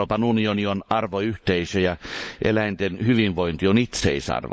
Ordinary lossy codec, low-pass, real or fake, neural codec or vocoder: none; none; fake; codec, 16 kHz, 16 kbps, FunCodec, trained on LibriTTS, 50 frames a second